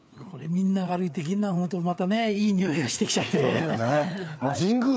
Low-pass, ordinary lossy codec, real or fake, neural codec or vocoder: none; none; fake; codec, 16 kHz, 4 kbps, FreqCodec, larger model